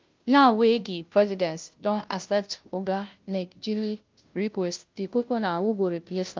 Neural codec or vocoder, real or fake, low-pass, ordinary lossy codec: codec, 16 kHz, 0.5 kbps, FunCodec, trained on Chinese and English, 25 frames a second; fake; 7.2 kHz; Opus, 24 kbps